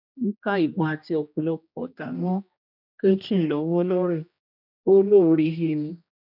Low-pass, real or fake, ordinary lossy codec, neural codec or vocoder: 5.4 kHz; fake; none; codec, 16 kHz, 1 kbps, X-Codec, HuBERT features, trained on general audio